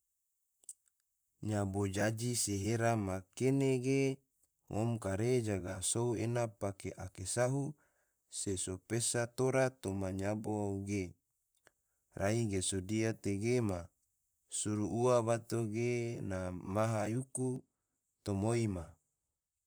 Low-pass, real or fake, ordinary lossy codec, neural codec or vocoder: none; fake; none; vocoder, 44.1 kHz, 128 mel bands, Pupu-Vocoder